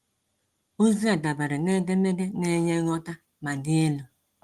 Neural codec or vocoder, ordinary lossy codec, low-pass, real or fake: none; Opus, 24 kbps; 14.4 kHz; real